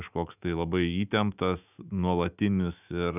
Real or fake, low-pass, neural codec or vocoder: fake; 3.6 kHz; autoencoder, 48 kHz, 128 numbers a frame, DAC-VAE, trained on Japanese speech